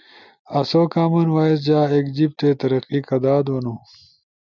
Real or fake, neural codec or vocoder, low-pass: real; none; 7.2 kHz